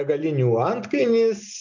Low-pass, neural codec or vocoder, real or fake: 7.2 kHz; none; real